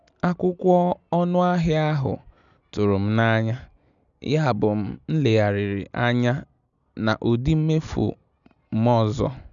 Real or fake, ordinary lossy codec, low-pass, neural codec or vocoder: real; none; 7.2 kHz; none